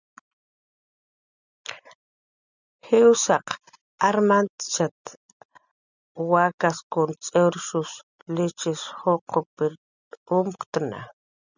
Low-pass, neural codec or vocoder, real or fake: 7.2 kHz; none; real